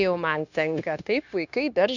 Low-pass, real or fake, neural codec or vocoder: 7.2 kHz; fake; codec, 16 kHz, 0.9 kbps, LongCat-Audio-Codec